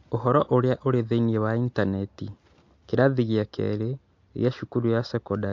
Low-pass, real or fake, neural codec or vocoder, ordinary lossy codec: 7.2 kHz; real; none; MP3, 48 kbps